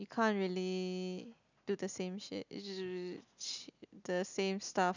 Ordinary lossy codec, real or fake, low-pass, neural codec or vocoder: none; real; 7.2 kHz; none